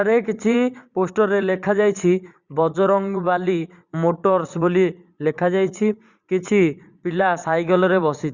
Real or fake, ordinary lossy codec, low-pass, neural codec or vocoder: fake; Opus, 64 kbps; 7.2 kHz; vocoder, 44.1 kHz, 80 mel bands, Vocos